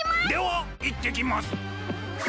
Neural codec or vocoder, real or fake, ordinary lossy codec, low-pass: none; real; none; none